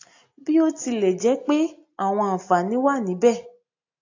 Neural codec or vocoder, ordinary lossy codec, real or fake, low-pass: none; AAC, 48 kbps; real; 7.2 kHz